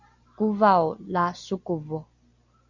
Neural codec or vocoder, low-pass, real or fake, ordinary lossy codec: none; 7.2 kHz; real; Opus, 64 kbps